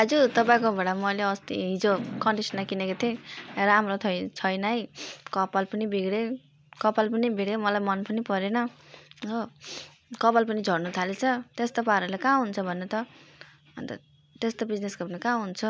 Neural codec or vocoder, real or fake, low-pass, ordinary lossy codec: none; real; none; none